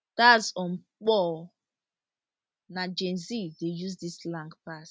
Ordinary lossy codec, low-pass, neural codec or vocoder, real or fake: none; none; none; real